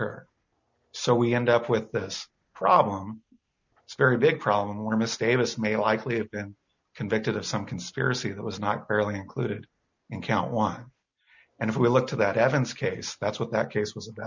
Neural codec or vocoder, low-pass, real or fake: none; 7.2 kHz; real